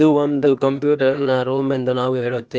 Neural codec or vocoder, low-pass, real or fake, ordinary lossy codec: codec, 16 kHz, 0.8 kbps, ZipCodec; none; fake; none